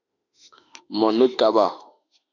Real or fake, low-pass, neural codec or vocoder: fake; 7.2 kHz; autoencoder, 48 kHz, 32 numbers a frame, DAC-VAE, trained on Japanese speech